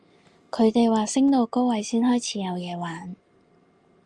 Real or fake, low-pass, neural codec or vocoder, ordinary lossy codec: real; 10.8 kHz; none; Opus, 32 kbps